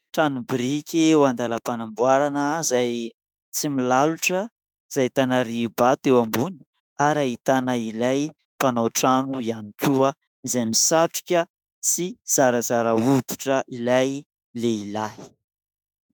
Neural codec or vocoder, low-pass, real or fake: autoencoder, 48 kHz, 32 numbers a frame, DAC-VAE, trained on Japanese speech; 19.8 kHz; fake